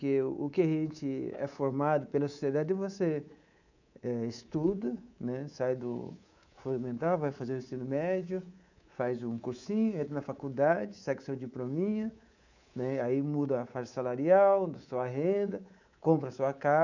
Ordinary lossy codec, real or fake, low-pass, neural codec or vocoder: none; fake; 7.2 kHz; codec, 24 kHz, 3.1 kbps, DualCodec